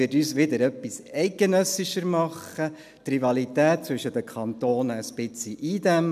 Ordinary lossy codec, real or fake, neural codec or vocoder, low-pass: none; real; none; 14.4 kHz